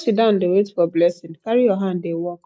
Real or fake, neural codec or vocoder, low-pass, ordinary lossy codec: real; none; none; none